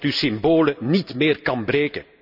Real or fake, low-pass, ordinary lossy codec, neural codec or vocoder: real; 5.4 kHz; none; none